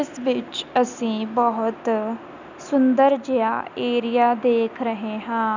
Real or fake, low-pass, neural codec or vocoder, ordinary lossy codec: real; 7.2 kHz; none; none